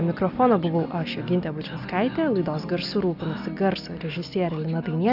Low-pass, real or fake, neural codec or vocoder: 5.4 kHz; real; none